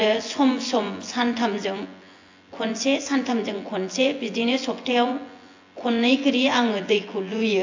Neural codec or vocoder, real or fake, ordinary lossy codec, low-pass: vocoder, 24 kHz, 100 mel bands, Vocos; fake; AAC, 48 kbps; 7.2 kHz